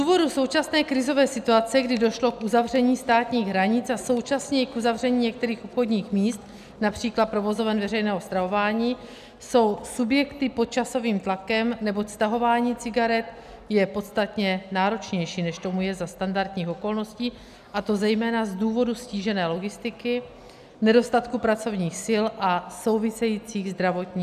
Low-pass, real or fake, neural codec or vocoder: 14.4 kHz; real; none